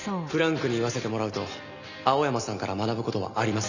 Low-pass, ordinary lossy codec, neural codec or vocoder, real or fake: 7.2 kHz; none; none; real